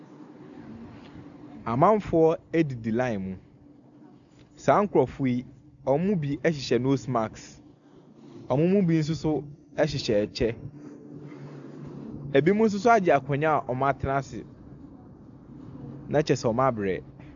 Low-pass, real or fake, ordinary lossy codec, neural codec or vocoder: 7.2 kHz; real; MP3, 96 kbps; none